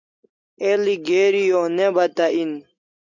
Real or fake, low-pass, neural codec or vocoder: real; 7.2 kHz; none